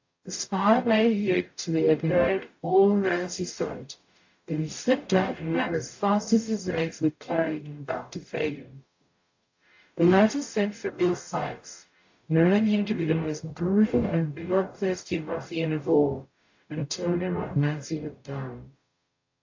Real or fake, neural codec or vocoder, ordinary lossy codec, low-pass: fake; codec, 44.1 kHz, 0.9 kbps, DAC; AAC, 48 kbps; 7.2 kHz